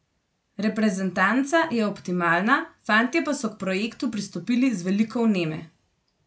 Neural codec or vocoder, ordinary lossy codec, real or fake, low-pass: none; none; real; none